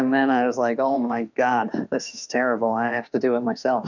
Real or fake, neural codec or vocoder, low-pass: fake; autoencoder, 48 kHz, 32 numbers a frame, DAC-VAE, trained on Japanese speech; 7.2 kHz